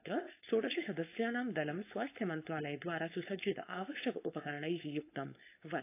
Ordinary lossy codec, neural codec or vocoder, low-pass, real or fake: AAC, 24 kbps; codec, 16 kHz, 4.8 kbps, FACodec; 3.6 kHz; fake